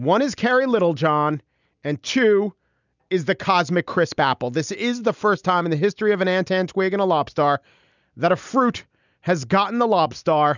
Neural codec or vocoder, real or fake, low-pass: none; real; 7.2 kHz